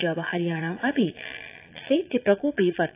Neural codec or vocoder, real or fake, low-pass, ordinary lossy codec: vocoder, 22.05 kHz, 80 mel bands, Vocos; fake; 3.6 kHz; AAC, 24 kbps